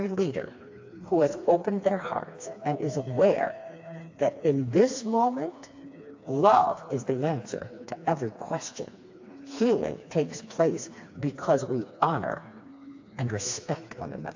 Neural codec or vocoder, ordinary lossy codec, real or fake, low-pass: codec, 16 kHz, 2 kbps, FreqCodec, smaller model; AAC, 48 kbps; fake; 7.2 kHz